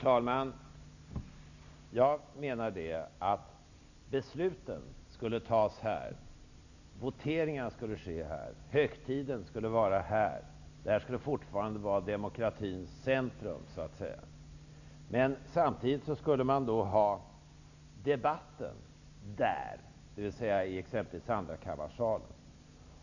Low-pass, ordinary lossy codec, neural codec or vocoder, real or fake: 7.2 kHz; none; none; real